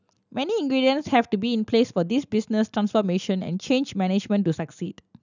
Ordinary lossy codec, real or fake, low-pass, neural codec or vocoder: none; real; 7.2 kHz; none